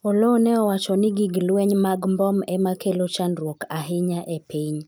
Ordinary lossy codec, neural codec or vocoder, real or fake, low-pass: none; none; real; none